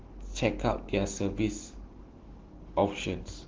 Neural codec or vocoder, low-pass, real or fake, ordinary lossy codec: none; 7.2 kHz; real; Opus, 16 kbps